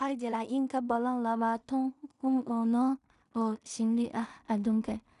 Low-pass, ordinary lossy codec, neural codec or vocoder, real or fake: 10.8 kHz; none; codec, 16 kHz in and 24 kHz out, 0.4 kbps, LongCat-Audio-Codec, two codebook decoder; fake